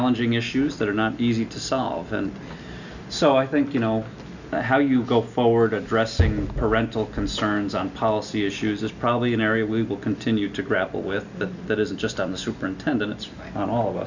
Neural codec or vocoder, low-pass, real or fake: none; 7.2 kHz; real